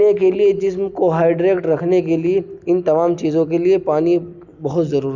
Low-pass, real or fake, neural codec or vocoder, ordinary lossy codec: 7.2 kHz; real; none; none